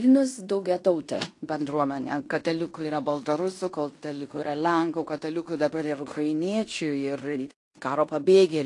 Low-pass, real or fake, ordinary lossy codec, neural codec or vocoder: 10.8 kHz; fake; AAC, 48 kbps; codec, 16 kHz in and 24 kHz out, 0.9 kbps, LongCat-Audio-Codec, fine tuned four codebook decoder